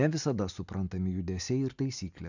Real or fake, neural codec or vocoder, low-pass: real; none; 7.2 kHz